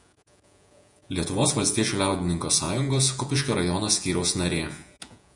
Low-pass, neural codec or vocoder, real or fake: 10.8 kHz; vocoder, 48 kHz, 128 mel bands, Vocos; fake